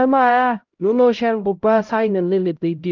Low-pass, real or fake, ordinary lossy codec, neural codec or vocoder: 7.2 kHz; fake; Opus, 32 kbps; codec, 16 kHz, 0.5 kbps, X-Codec, HuBERT features, trained on LibriSpeech